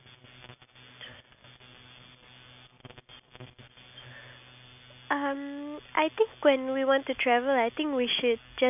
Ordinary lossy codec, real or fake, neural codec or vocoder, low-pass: none; real; none; 3.6 kHz